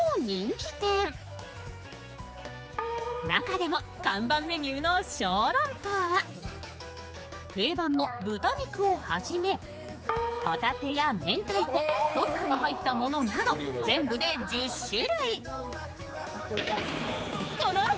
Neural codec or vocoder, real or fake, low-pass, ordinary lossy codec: codec, 16 kHz, 4 kbps, X-Codec, HuBERT features, trained on general audio; fake; none; none